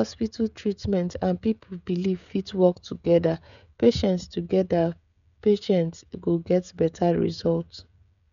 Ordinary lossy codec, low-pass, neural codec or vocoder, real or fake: none; 7.2 kHz; codec, 16 kHz, 16 kbps, FreqCodec, smaller model; fake